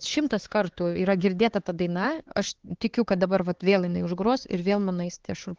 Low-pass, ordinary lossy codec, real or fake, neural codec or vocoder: 7.2 kHz; Opus, 16 kbps; fake; codec, 16 kHz, 4 kbps, X-Codec, HuBERT features, trained on LibriSpeech